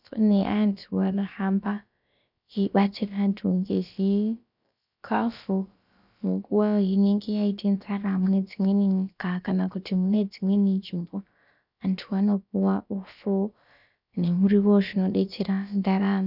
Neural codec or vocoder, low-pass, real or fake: codec, 16 kHz, about 1 kbps, DyCAST, with the encoder's durations; 5.4 kHz; fake